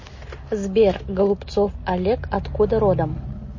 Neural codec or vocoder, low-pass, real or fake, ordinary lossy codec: none; 7.2 kHz; real; MP3, 32 kbps